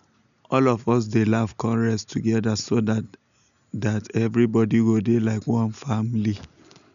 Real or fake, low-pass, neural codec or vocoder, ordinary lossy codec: real; 7.2 kHz; none; none